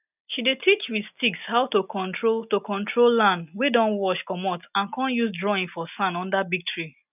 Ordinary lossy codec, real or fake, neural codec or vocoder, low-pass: none; real; none; 3.6 kHz